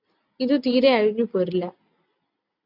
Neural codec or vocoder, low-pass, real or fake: none; 5.4 kHz; real